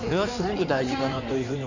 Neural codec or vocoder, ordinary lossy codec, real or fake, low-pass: codec, 16 kHz in and 24 kHz out, 2.2 kbps, FireRedTTS-2 codec; none; fake; 7.2 kHz